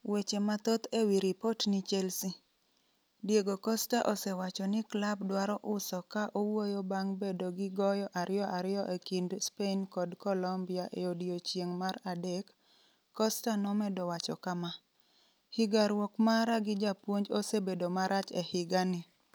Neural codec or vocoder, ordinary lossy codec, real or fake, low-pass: none; none; real; none